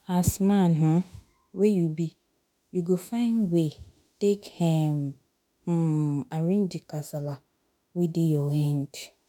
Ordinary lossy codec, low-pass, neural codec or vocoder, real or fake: none; 19.8 kHz; autoencoder, 48 kHz, 32 numbers a frame, DAC-VAE, trained on Japanese speech; fake